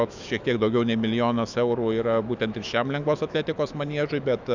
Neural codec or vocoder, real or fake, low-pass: autoencoder, 48 kHz, 128 numbers a frame, DAC-VAE, trained on Japanese speech; fake; 7.2 kHz